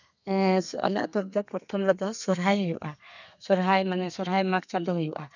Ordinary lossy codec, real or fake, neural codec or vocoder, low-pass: none; fake; codec, 32 kHz, 1.9 kbps, SNAC; 7.2 kHz